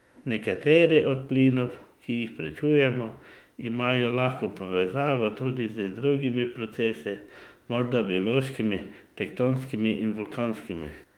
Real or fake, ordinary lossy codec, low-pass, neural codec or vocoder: fake; Opus, 32 kbps; 19.8 kHz; autoencoder, 48 kHz, 32 numbers a frame, DAC-VAE, trained on Japanese speech